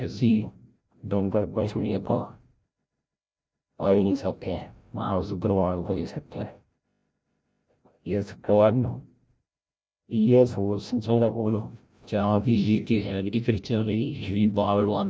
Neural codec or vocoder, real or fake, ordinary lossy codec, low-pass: codec, 16 kHz, 0.5 kbps, FreqCodec, larger model; fake; none; none